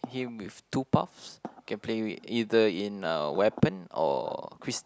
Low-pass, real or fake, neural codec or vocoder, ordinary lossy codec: none; real; none; none